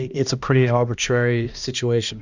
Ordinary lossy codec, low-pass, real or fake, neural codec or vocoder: Opus, 64 kbps; 7.2 kHz; fake; codec, 16 kHz, 1 kbps, X-Codec, HuBERT features, trained on balanced general audio